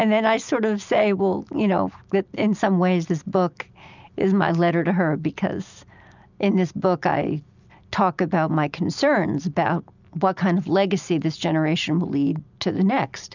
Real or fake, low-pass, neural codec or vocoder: real; 7.2 kHz; none